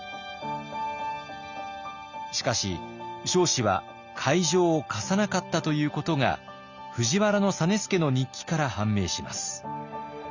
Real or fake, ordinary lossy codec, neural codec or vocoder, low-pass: real; Opus, 64 kbps; none; 7.2 kHz